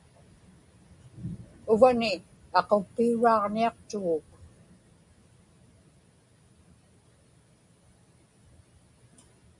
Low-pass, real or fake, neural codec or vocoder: 10.8 kHz; real; none